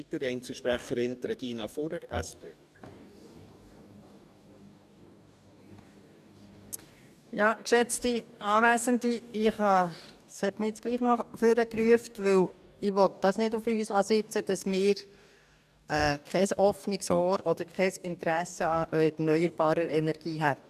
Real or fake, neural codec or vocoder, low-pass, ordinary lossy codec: fake; codec, 44.1 kHz, 2.6 kbps, DAC; 14.4 kHz; none